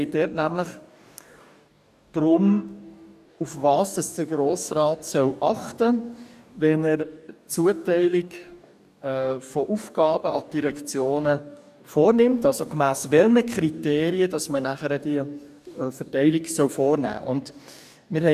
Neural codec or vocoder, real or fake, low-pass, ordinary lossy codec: codec, 44.1 kHz, 2.6 kbps, DAC; fake; 14.4 kHz; none